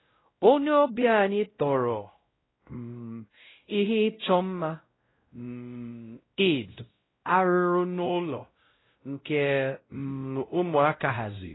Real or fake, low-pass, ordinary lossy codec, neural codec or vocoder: fake; 7.2 kHz; AAC, 16 kbps; codec, 16 kHz, 0.5 kbps, X-Codec, WavLM features, trained on Multilingual LibriSpeech